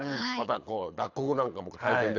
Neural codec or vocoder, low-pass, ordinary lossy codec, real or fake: codec, 24 kHz, 6 kbps, HILCodec; 7.2 kHz; AAC, 48 kbps; fake